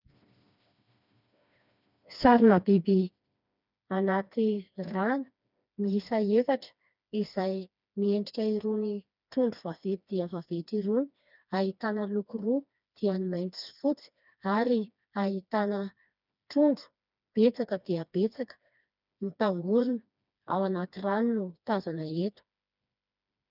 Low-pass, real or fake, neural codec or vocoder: 5.4 kHz; fake; codec, 16 kHz, 2 kbps, FreqCodec, smaller model